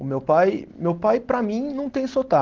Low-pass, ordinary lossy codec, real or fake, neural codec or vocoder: 7.2 kHz; Opus, 16 kbps; real; none